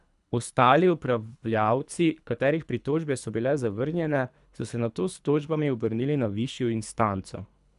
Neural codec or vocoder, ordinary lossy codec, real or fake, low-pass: codec, 24 kHz, 3 kbps, HILCodec; none; fake; 10.8 kHz